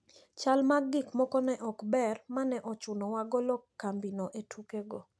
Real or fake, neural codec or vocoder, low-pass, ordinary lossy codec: real; none; none; none